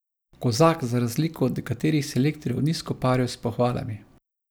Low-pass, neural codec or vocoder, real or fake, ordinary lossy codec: none; none; real; none